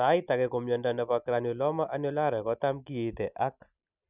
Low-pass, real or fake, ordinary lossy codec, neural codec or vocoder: 3.6 kHz; real; none; none